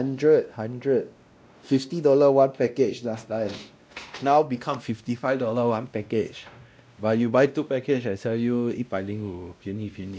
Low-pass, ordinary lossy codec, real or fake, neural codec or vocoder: none; none; fake; codec, 16 kHz, 1 kbps, X-Codec, WavLM features, trained on Multilingual LibriSpeech